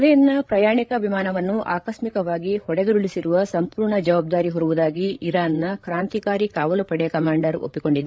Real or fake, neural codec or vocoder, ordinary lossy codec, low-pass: fake; codec, 16 kHz, 8 kbps, FreqCodec, larger model; none; none